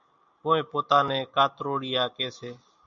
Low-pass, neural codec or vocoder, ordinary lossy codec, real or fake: 7.2 kHz; none; MP3, 64 kbps; real